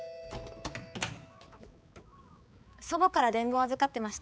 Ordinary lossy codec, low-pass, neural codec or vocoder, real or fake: none; none; codec, 16 kHz, 4 kbps, X-Codec, HuBERT features, trained on balanced general audio; fake